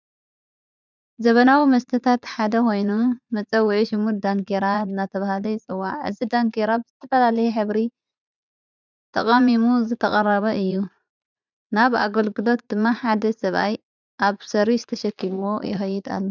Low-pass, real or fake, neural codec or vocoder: 7.2 kHz; fake; vocoder, 44.1 kHz, 80 mel bands, Vocos